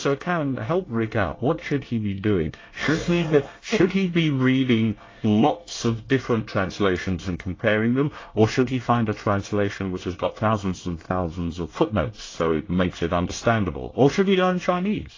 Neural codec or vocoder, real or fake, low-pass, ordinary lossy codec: codec, 24 kHz, 1 kbps, SNAC; fake; 7.2 kHz; AAC, 32 kbps